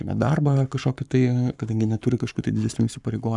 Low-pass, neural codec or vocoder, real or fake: 10.8 kHz; codec, 44.1 kHz, 7.8 kbps, Pupu-Codec; fake